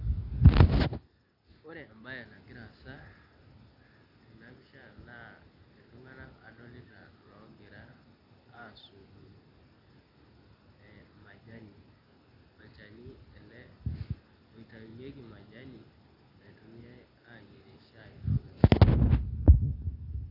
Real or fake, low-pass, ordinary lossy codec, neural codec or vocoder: real; 5.4 kHz; AAC, 48 kbps; none